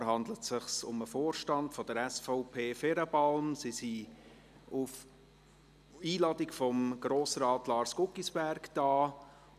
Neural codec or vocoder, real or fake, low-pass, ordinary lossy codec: none; real; 14.4 kHz; none